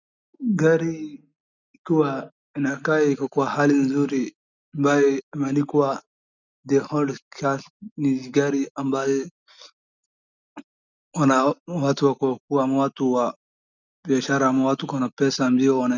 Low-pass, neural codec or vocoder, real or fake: 7.2 kHz; none; real